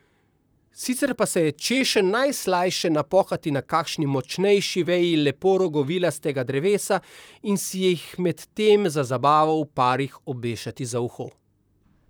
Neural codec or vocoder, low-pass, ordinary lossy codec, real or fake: vocoder, 44.1 kHz, 128 mel bands, Pupu-Vocoder; none; none; fake